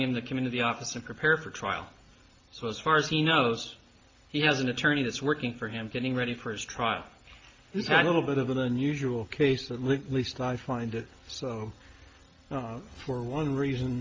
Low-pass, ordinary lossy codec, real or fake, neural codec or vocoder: 7.2 kHz; Opus, 24 kbps; real; none